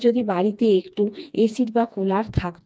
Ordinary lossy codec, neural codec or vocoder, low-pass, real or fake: none; codec, 16 kHz, 2 kbps, FreqCodec, smaller model; none; fake